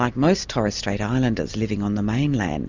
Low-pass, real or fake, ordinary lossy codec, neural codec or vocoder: 7.2 kHz; real; Opus, 64 kbps; none